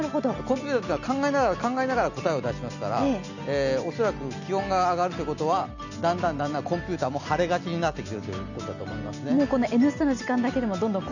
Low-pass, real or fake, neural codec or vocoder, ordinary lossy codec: 7.2 kHz; real; none; none